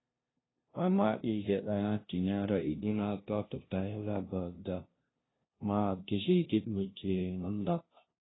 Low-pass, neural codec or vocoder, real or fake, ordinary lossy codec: 7.2 kHz; codec, 16 kHz, 0.5 kbps, FunCodec, trained on LibriTTS, 25 frames a second; fake; AAC, 16 kbps